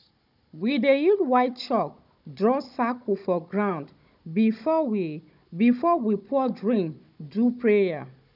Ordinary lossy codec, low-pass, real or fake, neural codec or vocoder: none; 5.4 kHz; fake; codec, 16 kHz, 16 kbps, FunCodec, trained on Chinese and English, 50 frames a second